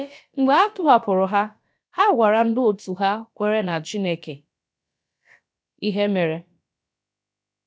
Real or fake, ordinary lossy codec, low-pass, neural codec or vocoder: fake; none; none; codec, 16 kHz, about 1 kbps, DyCAST, with the encoder's durations